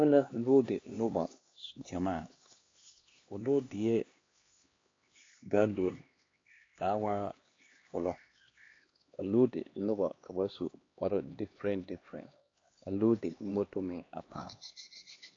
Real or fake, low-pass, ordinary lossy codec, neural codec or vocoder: fake; 7.2 kHz; AAC, 32 kbps; codec, 16 kHz, 2 kbps, X-Codec, HuBERT features, trained on LibriSpeech